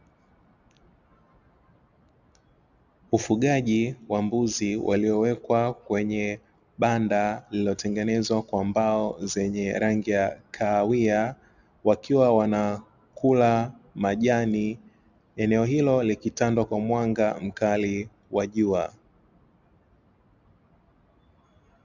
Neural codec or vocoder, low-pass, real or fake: none; 7.2 kHz; real